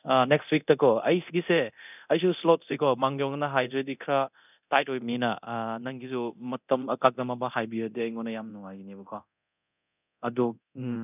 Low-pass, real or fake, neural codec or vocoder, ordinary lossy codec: 3.6 kHz; fake; codec, 24 kHz, 0.9 kbps, DualCodec; none